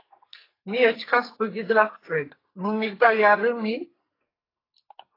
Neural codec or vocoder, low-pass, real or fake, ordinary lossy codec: codec, 44.1 kHz, 2.6 kbps, SNAC; 5.4 kHz; fake; AAC, 24 kbps